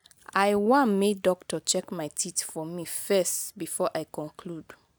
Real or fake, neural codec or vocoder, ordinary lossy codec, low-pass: real; none; none; none